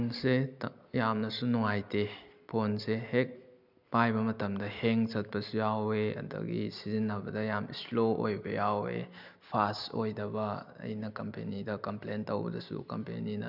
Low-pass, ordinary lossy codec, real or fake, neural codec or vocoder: 5.4 kHz; none; real; none